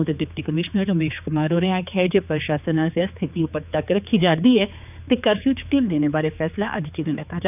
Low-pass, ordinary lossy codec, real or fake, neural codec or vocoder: 3.6 kHz; none; fake; codec, 16 kHz, 4 kbps, X-Codec, HuBERT features, trained on general audio